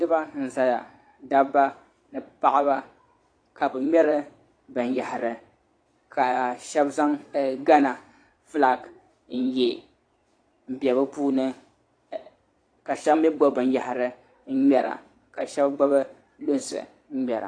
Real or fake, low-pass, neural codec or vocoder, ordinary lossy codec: fake; 9.9 kHz; vocoder, 22.05 kHz, 80 mel bands, Vocos; AAC, 48 kbps